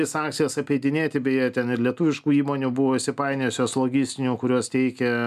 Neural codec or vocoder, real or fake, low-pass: none; real; 14.4 kHz